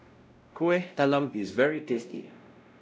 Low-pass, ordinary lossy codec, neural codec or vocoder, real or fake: none; none; codec, 16 kHz, 0.5 kbps, X-Codec, WavLM features, trained on Multilingual LibriSpeech; fake